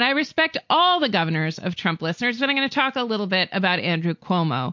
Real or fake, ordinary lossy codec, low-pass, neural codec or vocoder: real; MP3, 48 kbps; 7.2 kHz; none